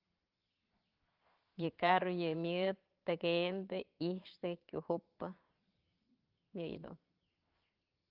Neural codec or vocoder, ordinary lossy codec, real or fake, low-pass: vocoder, 22.05 kHz, 80 mel bands, Vocos; Opus, 32 kbps; fake; 5.4 kHz